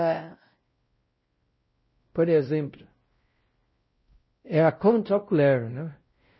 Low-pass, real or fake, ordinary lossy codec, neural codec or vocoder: 7.2 kHz; fake; MP3, 24 kbps; codec, 16 kHz, 0.5 kbps, X-Codec, WavLM features, trained on Multilingual LibriSpeech